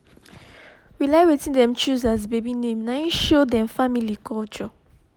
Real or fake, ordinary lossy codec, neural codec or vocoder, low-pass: real; none; none; none